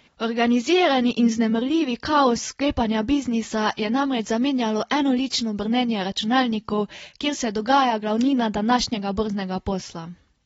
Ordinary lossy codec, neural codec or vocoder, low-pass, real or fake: AAC, 24 kbps; none; 14.4 kHz; real